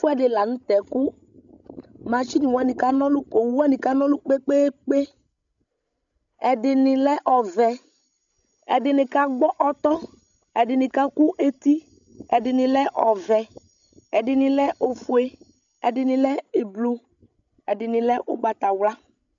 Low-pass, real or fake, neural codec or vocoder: 7.2 kHz; fake; codec, 16 kHz, 16 kbps, FreqCodec, larger model